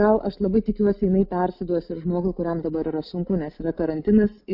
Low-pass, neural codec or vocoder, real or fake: 5.4 kHz; none; real